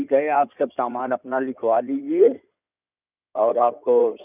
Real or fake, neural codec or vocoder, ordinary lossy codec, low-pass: fake; codec, 16 kHz in and 24 kHz out, 2.2 kbps, FireRedTTS-2 codec; none; 3.6 kHz